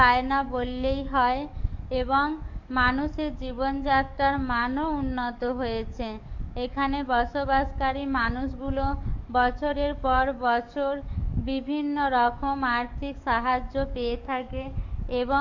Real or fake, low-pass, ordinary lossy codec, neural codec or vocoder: fake; 7.2 kHz; MP3, 64 kbps; codec, 44.1 kHz, 7.8 kbps, DAC